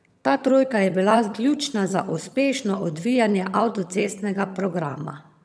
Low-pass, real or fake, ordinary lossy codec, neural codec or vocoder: none; fake; none; vocoder, 22.05 kHz, 80 mel bands, HiFi-GAN